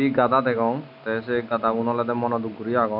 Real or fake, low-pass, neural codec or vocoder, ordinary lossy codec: real; 5.4 kHz; none; none